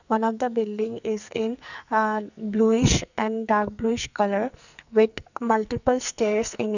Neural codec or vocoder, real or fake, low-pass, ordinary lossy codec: codec, 44.1 kHz, 2.6 kbps, SNAC; fake; 7.2 kHz; none